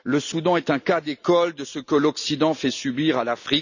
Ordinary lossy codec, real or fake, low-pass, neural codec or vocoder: none; real; 7.2 kHz; none